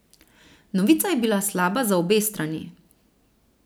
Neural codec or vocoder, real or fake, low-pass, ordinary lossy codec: none; real; none; none